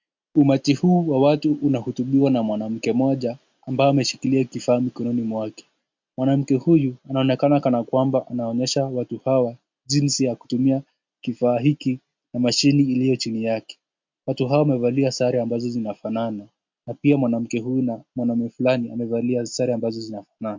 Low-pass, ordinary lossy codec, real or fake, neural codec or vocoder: 7.2 kHz; MP3, 64 kbps; real; none